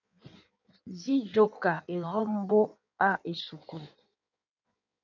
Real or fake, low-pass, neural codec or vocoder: fake; 7.2 kHz; codec, 16 kHz in and 24 kHz out, 1.1 kbps, FireRedTTS-2 codec